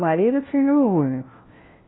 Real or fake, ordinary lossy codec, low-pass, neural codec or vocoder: fake; AAC, 16 kbps; 7.2 kHz; codec, 16 kHz, 1 kbps, FunCodec, trained on LibriTTS, 50 frames a second